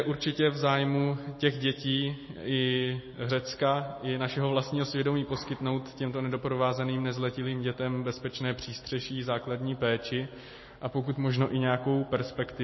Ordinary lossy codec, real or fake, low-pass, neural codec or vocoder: MP3, 24 kbps; real; 7.2 kHz; none